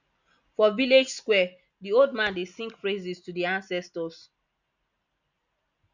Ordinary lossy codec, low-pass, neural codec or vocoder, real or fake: none; 7.2 kHz; none; real